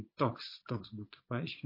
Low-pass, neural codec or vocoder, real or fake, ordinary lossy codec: 5.4 kHz; vocoder, 22.05 kHz, 80 mel bands, Vocos; fake; MP3, 24 kbps